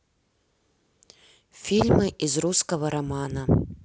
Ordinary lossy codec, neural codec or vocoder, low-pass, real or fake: none; none; none; real